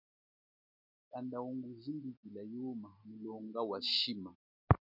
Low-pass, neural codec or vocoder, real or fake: 5.4 kHz; none; real